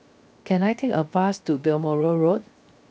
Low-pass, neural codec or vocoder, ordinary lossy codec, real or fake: none; codec, 16 kHz, 0.7 kbps, FocalCodec; none; fake